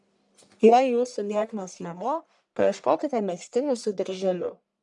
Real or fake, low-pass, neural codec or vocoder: fake; 10.8 kHz; codec, 44.1 kHz, 1.7 kbps, Pupu-Codec